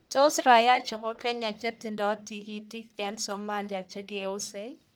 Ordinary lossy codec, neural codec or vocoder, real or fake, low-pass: none; codec, 44.1 kHz, 1.7 kbps, Pupu-Codec; fake; none